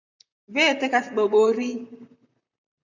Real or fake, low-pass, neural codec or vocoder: fake; 7.2 kHz; vocoder, 44.1 kHz, 128 mel bands, Pupu-Vocoder